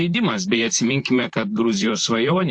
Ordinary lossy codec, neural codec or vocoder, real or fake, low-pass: AAC, 64 kbps; vocoder, 44.1 kHz, 128 mel bands, Pupu-Vocoder; fake; 10.8 kHz